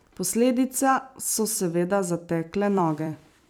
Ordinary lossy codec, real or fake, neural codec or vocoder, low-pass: none; fake; vocoder, 44.1 kHz, 128 mel bands every 256 samples, BigVGAN v2; none